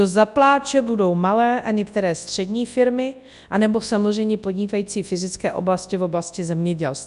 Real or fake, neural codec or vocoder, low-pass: fake; codec, 24 kHz, 0.9 kbps, WavTokenizer, large speech release; 10.8 kHz